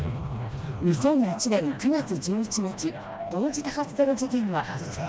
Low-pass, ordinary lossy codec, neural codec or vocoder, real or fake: none; none; codec, 16 kHz, 1 kbps, FreqCodec, smaller model; fake